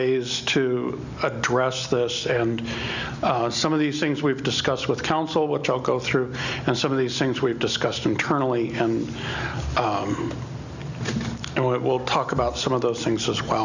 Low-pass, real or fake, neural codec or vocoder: 7.2 kHz; real; none